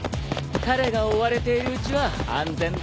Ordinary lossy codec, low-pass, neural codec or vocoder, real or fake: none; none; none; real